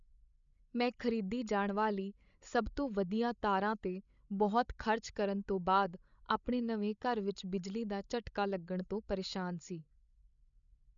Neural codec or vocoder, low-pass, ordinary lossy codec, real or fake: codec, 16 kHz, 16 kbps, FreqCodec, larger model; 7.2 kHz; none; fake